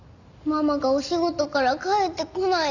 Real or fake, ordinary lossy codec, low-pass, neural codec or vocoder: real; none; 7.2 kHz; none